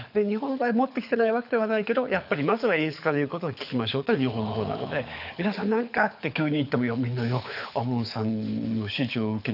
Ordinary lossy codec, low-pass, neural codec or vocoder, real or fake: none; 5.4 kHz; codec, 24 kHz, 6 kbps, HILCodec; fake